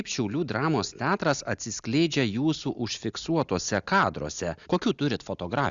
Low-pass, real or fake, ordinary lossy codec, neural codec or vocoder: 7.2 kHz; real; Opus, 64 kbps; none